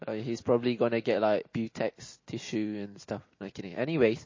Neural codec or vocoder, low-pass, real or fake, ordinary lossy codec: none; 7.2 kHz; real; MP3, 32 kbps